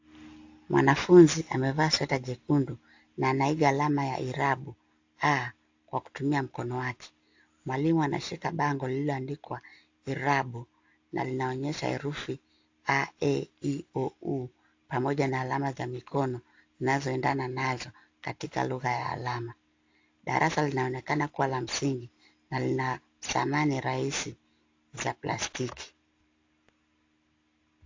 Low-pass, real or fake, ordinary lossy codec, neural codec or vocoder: 7.2 kHz; real; AAC, 48 kbps; none